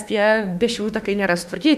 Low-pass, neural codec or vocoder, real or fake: 14.4 kHz; autoencoder, 48 kHz, 32 numbers a frame, DAC-VAE, trained on Japanese speech; fake